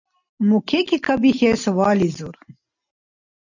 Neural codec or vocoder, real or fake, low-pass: none; real; 7.2 kHz